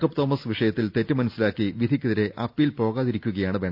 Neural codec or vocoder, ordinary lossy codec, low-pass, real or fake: none; none; 5.4 kHz; real